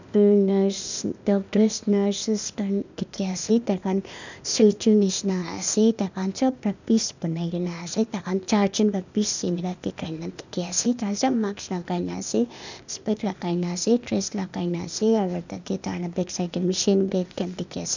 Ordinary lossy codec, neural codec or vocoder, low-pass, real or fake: none; codec, 16 kHz, 0.8 kbps, ZipCodec; 7.2 kHz; fake